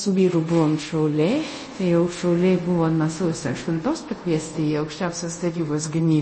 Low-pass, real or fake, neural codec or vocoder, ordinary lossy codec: 10.8 kHz; fake; codec, 24 kHz, 0.5 kbps, DualCodec; MP3, 32 kbps